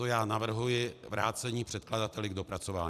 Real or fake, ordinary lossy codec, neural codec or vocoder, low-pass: fake; AAC, 96 kbps; vocoder, 44.1 kHz, 128 mel bands every 256 samples, BigVGAN v2; 14.4 kHz